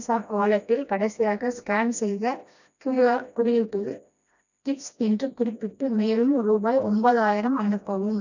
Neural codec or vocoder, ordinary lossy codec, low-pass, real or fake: codec, 16 kHz, 1 kbps, FreqCodec, smaller model; none; 7.2 kHz; fake